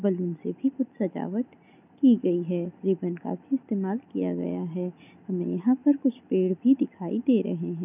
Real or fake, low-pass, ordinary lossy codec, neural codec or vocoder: real; 3.6 kHz; none; none